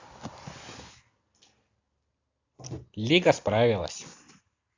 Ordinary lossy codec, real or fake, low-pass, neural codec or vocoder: AAC, 48 kbps; real; 7.2 kHz; none